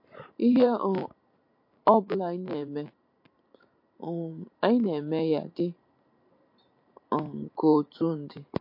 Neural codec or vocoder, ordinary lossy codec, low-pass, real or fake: vocoder, 44.1 kHz, 128 mel bands every 512 samples, BigVGAN v2; MP3, 32 kbps; 5.4 kHz; fake